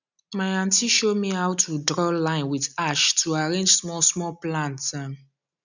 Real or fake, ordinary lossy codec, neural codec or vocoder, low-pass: real; none; none; 7.2 kHz